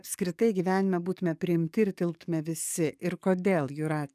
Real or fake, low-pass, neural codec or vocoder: fake; 14.4 kHz; codec, 44.1 kHz, 7.8 kbps, DAC